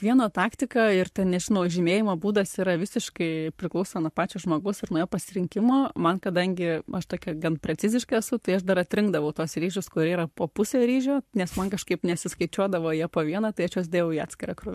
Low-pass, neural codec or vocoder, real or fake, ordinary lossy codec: 14.4 kHz; codec, 44.1 kHz, 7.8 kbps, Pupu-Codec; fake; MP3, 64 kbps